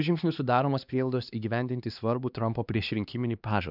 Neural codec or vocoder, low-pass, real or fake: codec, 16 kHz, 2 kbps, X-Codec, HuBERT features, trained on LibriSpeech; 5.4 kHz; fake